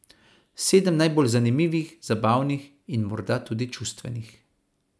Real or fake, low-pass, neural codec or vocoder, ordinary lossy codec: real; none; none; none